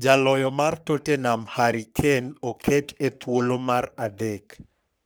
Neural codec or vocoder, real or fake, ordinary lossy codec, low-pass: codec, 44.1 kHz, 3.4 kbps, Pupu-Codec; fake; none; none